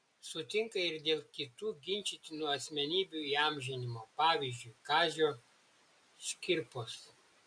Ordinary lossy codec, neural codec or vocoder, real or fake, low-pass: MP3, 64 kbps; none; real; 9.9 kHz